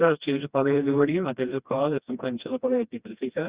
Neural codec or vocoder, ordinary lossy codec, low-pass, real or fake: codec, 16 kHz, 1 kbps, FreqCodec, smaller model; Opus, 64 kbps; 3.6 kHz; fake